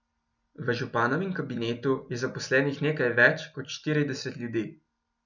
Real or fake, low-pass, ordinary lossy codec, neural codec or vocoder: real; 7.2 kHz; none; none